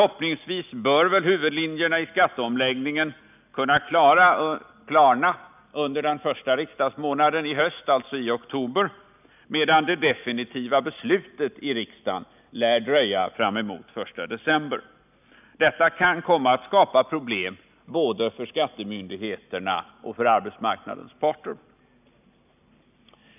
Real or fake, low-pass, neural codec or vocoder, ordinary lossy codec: real; 3.6 kHz; none; none